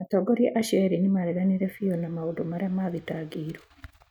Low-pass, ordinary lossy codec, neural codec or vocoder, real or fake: 19.8 kHz; none; none; real